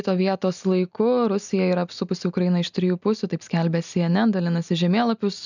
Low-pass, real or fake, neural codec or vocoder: 7.2 kHz; real; none